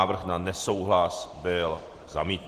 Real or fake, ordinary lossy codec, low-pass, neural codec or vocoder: real; Opus, 16 kbps; 14.4 kHz; none